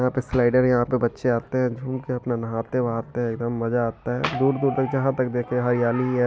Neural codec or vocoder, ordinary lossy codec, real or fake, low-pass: none; none; real; none